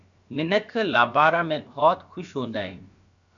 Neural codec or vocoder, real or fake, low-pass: codec, 16 kHz, about 1 kbps, DyCAST, with the encoder's durations; fake; 7.2 kHz